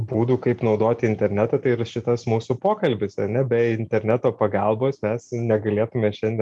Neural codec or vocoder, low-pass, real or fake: none; 10.8 kHz; real